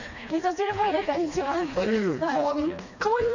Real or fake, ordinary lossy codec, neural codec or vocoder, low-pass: fake; none; codec, 16 kHz, 2 kbps, FreqCodec, smaller model; 7.2 kHz